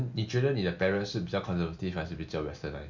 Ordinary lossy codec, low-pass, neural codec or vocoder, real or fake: none; 7.2 kHz; none; real